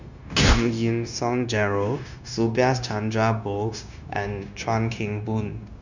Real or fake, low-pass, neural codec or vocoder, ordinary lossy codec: fake; 7.2 kHz; codec, 16 kHz, 0.9 kbps, LongCat-Audio-Codec; none